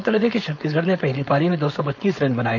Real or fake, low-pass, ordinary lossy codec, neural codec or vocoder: fake; 7.2 kHz; none; codec, 16 kHz, 4.8 kbps, FACodec